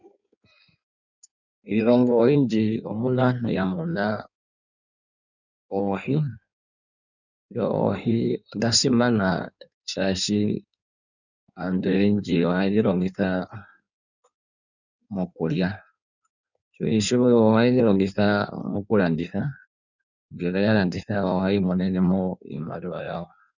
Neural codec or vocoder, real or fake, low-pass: codec, 16 kHz in and 24 kHz out, 1.1 kbps, FireRedTTS-2 codec; fake; 7.2 kHz